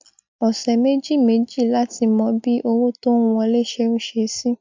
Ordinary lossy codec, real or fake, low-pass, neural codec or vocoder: MP3, 64 kbps; real; 7.2 kHz; none